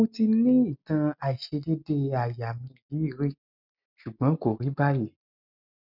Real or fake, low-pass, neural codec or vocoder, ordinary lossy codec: real; 5.4 kHz; none; none